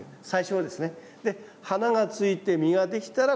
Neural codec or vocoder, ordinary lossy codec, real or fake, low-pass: none; none; real; none